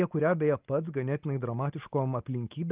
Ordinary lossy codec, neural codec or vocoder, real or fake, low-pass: Opus, 24 kbps; none; real; 3.6 kHz